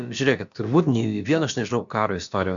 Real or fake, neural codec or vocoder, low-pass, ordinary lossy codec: fake; codec, 16 kHz, about 1 kbps, DyCAST, with the encoder's durations; 7.2 kHz; MP3, 96 kbps